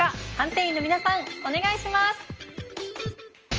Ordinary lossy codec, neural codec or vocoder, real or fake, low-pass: Opus, 24 kbps; none; real; 7.2 kHz